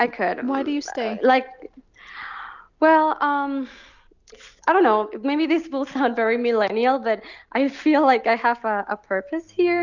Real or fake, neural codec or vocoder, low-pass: real; none; 7.2 kHz